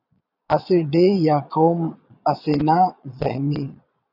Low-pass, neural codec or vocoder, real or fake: 5.4 kHz; vocoder, 22.05 kHz, 80 mel bands, Vocos; fake